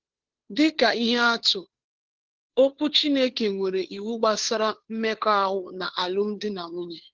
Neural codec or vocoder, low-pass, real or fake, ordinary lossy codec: codec, 16 kHz, 2 kbps, FunCodec, trained on Chinese and English, 25 frames a second; 7.2 kHz; fake; Opus, 16 kbps